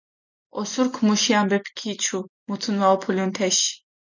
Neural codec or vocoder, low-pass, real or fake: none; 7.2 kHz; real